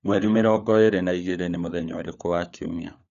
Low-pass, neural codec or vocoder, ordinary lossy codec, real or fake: 7.2 kHz; codec, 16 kHz, 4 kbps, FunCodec, trained on LibriTTS, 50 frames a second; none; fake